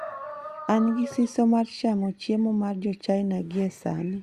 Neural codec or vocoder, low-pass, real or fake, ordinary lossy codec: none; 14.4 kHz; real; Opus, 64 kbps